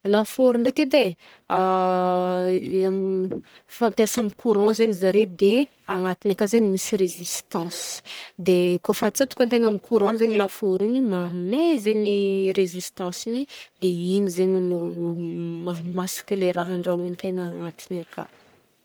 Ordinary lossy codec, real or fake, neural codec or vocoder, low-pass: none; fake; codec, 44.1 kHz, 1.7 kbps, Pupu-Codec; none